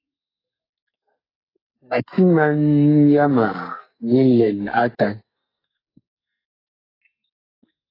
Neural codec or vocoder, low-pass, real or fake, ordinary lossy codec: codec, 44.1 kHz, 2.6 kbps, SNAC; 5.4 kHz; fake; AAC, 24 kbps